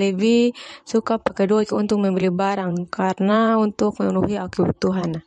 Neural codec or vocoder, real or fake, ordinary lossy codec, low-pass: vocoder, 44.1 kHz, 128 mel bands, Pupu-Vocoder; fake; MP3, 48 kbps; 19.8 kHz